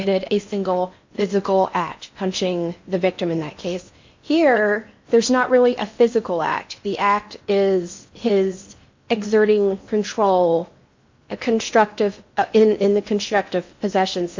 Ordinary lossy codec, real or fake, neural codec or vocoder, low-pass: MP3, 64 kbps; fake; codec, 16 kHz in and 24 kHz out, 0.8 kbps, FocalCodec, streaming, 65536 codes; 7.2 kHz